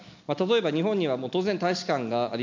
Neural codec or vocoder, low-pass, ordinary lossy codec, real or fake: none; 7.2 kHz; MP3, 64 kbps; real